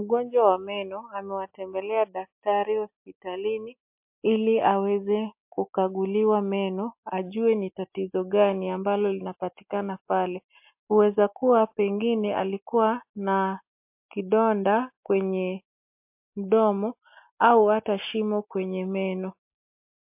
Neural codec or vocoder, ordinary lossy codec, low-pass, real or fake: none; MP3, 32 kbps; 3.6 kHz; real